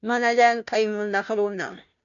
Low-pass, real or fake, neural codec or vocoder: 7.2 kHz; fake; codec, 16 kHz, 0.5 kbps, FunCodec, trained on Chinese and English, 25 frames a second